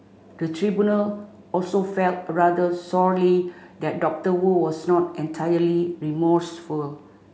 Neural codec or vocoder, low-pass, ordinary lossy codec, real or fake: none; none; none; real